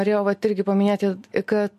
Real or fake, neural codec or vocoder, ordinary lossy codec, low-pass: real; none; MP3, 64 kbps; 14.4 kHz